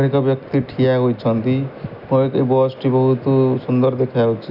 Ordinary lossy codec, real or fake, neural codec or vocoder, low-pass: none; real; none; 5.4 kHz